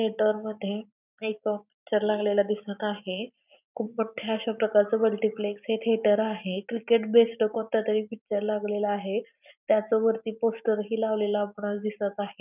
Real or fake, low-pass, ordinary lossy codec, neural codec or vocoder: real; 3.6 kHz; none; none